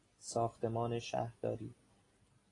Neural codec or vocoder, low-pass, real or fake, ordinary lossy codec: none; 10.8 kHz; real; AAC, 32 kbps